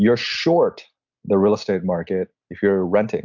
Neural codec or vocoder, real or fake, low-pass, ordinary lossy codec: none; real; 7.2 kHz; MP3, 64 kbps